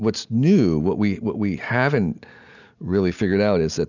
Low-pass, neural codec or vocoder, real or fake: 7.2 kHz; none; real